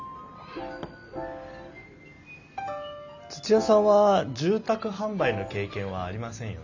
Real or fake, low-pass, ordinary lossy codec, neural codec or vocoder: real; 7.2 kHz; none; none